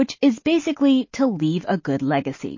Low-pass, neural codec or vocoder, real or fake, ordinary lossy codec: 7.2 kHz; none; real; MP3, 32 kbps